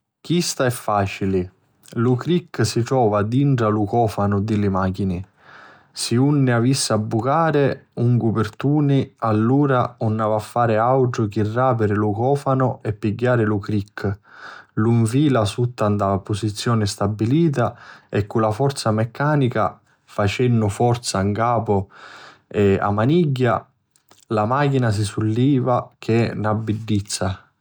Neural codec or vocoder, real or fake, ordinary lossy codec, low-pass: none; real; none; none